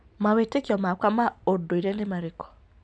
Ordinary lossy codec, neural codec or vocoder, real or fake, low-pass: none; none; real; 9.9 kHz